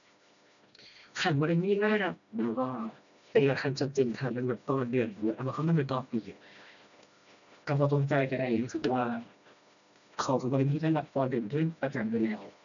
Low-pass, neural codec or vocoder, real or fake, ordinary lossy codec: 7.2 kHz; codec, 16 kHz, 1 kbps, FreqCodec, smaller model; fake; none